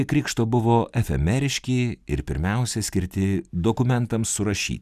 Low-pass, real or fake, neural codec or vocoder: 14.4 kHz; real; none